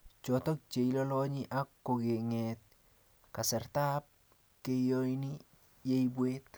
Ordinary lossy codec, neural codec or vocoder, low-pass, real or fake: none; none; none; real